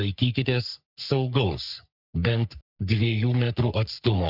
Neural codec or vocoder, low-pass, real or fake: codec, 44.1 kHz, 3.4 kbps, Pupu-Codec; 5.4 kHz; fake